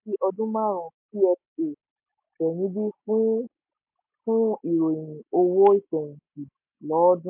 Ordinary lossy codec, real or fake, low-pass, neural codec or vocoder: MP3, 32 kbps; real; 3.6 kHz; none